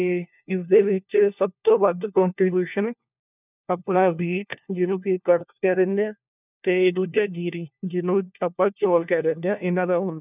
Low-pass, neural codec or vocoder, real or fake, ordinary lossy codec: 3.6 kHz; codec, 16 kHz, 1 kbps, FunCodec, trained on LibriTTS, 50 frames a second; fake; none